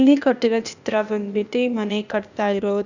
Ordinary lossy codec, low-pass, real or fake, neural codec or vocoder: none; 7.2 kHz; fake; codec, 16 kHz, 0.8 kbps, ZipCodec